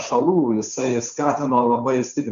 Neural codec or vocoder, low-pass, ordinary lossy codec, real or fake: codec, 16 kHz, 2 kbps, FunCodec, trained on Chinese and English, 25 frames a second; 7.2 kHz; MP3, 64 kbps; fake